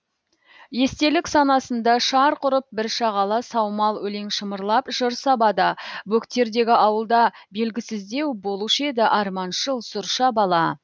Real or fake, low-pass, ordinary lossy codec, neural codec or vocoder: real; none; none; none